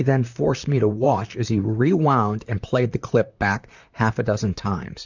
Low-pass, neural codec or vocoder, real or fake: 7.2 kHz; vocoder, 44.1 kHz, 128 mel bands, Pupu-Vocoder; fake